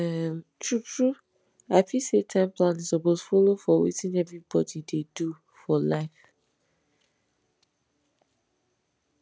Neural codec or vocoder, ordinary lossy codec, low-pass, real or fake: none; none; none; real